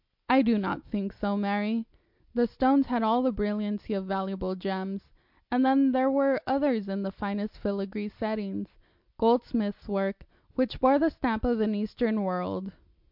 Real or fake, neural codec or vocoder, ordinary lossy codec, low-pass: real; none; MP3, 48 kbps; 5.4 kHz